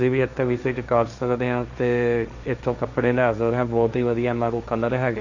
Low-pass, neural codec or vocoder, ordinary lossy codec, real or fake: 7.2 kHz; codec, 16 kHz, 1.1 kbps, Voila-Tokenizer; none; fake